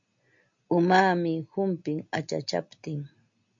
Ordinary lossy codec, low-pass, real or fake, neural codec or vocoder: MP3, 48 kbps; 7.2 kHz; real; none